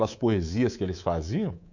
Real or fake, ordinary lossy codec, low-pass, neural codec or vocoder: fake; none; 7.2 kHz; codec, 24 kHz, 3.1 kbps, DualCodec